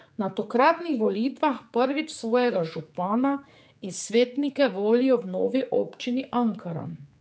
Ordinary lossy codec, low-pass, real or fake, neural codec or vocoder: none; none; fake; codec, 16 kHz, 4 kbps, X-Codec, HuBERT features, trained on general audio